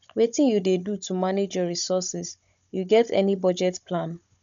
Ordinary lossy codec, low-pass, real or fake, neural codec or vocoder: none; 7.2 kHz; fake; codec, 16 kHz, 16 kbps, FunCodec, trained on Chinese and English, 50 frames a second